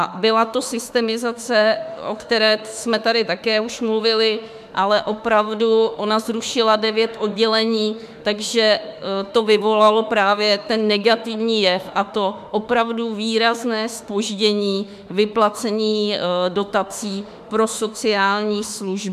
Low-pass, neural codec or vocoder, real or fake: 14.4 kHz; autoencoder, 48 kHz, 32 numbers a frame, DAC-VAE, trained on Japanese speech; fake